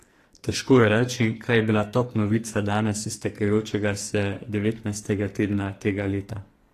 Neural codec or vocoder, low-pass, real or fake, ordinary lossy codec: codec, 44.1 kHz, 2.6 kbps, SNAC; 14.4 kHz; fake; AAC, 48 kbps